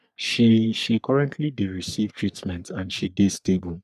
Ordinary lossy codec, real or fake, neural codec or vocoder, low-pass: none; fake; codec, 44.1 kHz, 3.4 kbps, Pupu-Codec; 14.4 kHz